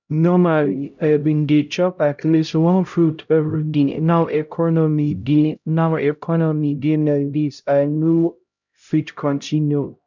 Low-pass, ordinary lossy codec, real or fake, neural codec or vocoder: 7.2 kHz; none; fake; codec, 16 kHz, 0.5 kbps, X-Codec, HuBERT features, trained on LibriSpeech